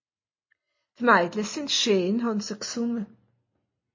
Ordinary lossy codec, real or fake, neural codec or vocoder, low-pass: MP3, 32 kbps; real; none; 7.2 kHz